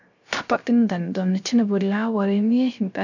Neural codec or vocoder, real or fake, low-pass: codec, 16 kHz, 0.3 kbps, FocalCodec; fake; 7.2 kHz